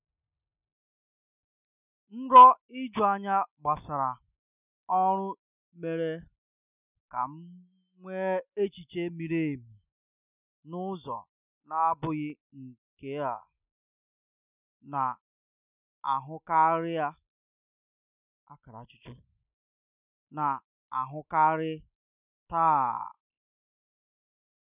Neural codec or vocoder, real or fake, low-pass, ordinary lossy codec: none; real; 3.6 kHz; none